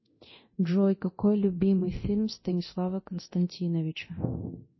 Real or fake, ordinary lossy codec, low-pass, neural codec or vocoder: fake; MP3, 24 kbps; 7.2 kHz; codec, 24 kHz, 0.9 kbps, DualCodec